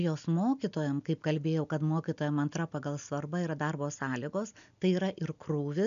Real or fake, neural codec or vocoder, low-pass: real; none; 7.2 kHz